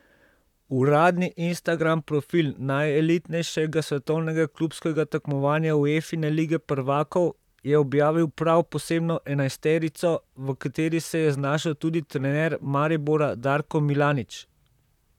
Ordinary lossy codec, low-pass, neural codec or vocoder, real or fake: none; 19.8 kHz; vocoder, 44.1 kHz, 128 mel bands, Pupu-Vocoder; fake